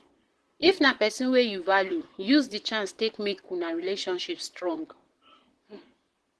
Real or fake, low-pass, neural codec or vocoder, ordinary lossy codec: fake; 10.8 kHz; vocoder, 44.1 kHz, 128 mel bands, Pupu-Vocoder; Opus, 16 kbps